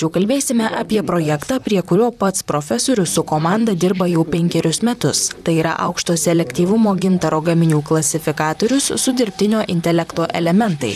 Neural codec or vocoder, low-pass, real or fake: vocoder, 44.1 kHz, 128 mel bands, Pupu-Vocoder; 14.4 kHz; fake